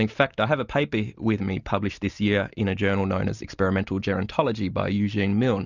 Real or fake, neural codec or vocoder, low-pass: real; none; 7.2 kHz